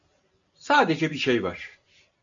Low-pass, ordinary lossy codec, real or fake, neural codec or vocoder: 7.2 kHz; AAC, 64 kbps; real; none